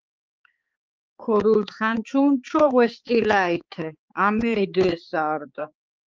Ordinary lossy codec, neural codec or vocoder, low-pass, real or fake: Opus, 24 kbps; codec, 16 kHz, 4 kbps, X-Codec, HuBERT features, trained on balanced general audio; 7.2 kHz; fake